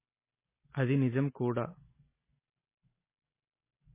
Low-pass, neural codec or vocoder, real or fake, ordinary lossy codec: 3.6 kHz; none; real; MP3, 16 kbps